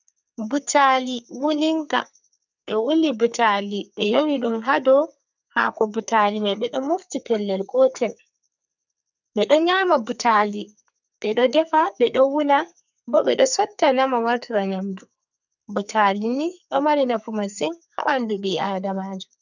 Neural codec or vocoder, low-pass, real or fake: codec, 44.1 kHz, 2.6 kbps, SNAC; 7.2 kHz; fake